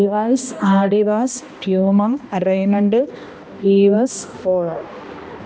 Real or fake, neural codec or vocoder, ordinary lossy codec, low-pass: fake; codec, 16 kHz, 1 kbps, X-Codec, HuBERT features, trained on balanced general audio; none; none